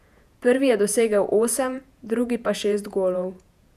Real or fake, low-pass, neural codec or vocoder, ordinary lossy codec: fake; 14.4 kHz; vocoder, 48 kHz, 128 mel bands, Vocos; none